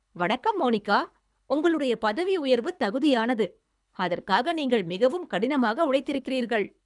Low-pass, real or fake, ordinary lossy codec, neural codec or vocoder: 10.8 kHz; fake; none; codec, 24 kHz, 3 kbps, HILCodec